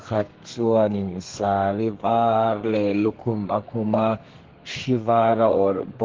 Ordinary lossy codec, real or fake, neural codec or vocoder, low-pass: Opus, 16 kbps; fake; codec, 32 kHz, 1.9 kbps, SNAC; 7.2 kHz